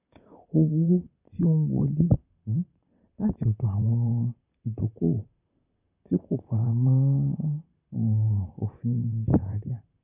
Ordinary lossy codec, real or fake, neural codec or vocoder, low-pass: none; fake; vocoder, 44.1 kHz, 128 mel bands, Pupu-Vocoder; 3.6 kHz